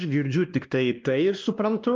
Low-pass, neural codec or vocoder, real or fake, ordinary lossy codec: 7.2 kHz; codec, 16 kHz, 1 kbps, X-Codec, WavLM features, trained on Multilingual LibriSpeech; fake; Opus, 24 kbps